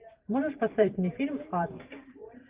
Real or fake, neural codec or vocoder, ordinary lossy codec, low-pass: fake; vocoder, 44.1 kHz, 128 mel bands every 512 samples, BigVGAN v2; Opus, 32 kbps; 3.6 kHz